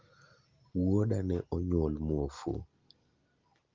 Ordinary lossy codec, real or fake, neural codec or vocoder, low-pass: Opus, 24 kbps; real; none; 7.2 kHz